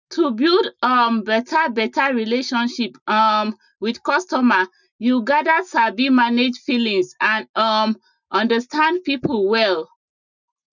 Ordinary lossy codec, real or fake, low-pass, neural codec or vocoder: none; real; 7.2 kHz; none